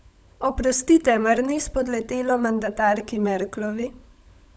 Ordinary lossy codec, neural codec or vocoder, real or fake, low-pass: none; codec, 16 kHz, 16 kbps, FunCodec, trained on LibriTTS, 50 frames a second; fake; none